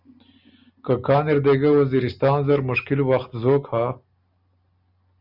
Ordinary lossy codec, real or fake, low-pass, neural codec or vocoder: Opus, 64 kbps; real; 5.4 kHz; none